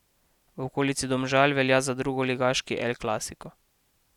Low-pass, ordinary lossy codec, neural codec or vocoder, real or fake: 19.8 kHz; none; none; real